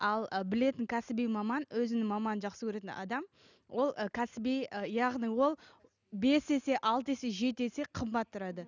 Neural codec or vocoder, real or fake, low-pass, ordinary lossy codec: none; real; 7.2 kHz; none